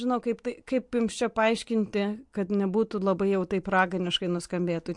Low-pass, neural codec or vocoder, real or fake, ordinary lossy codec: 10.8 kHz; none; real; MP3, 64 kbps